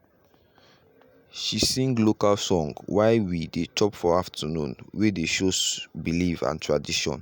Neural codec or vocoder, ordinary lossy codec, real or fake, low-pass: none; none; real; none